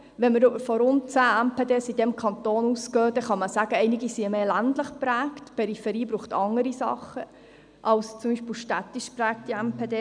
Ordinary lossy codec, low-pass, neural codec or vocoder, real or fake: none; 9.9 kHz; none; real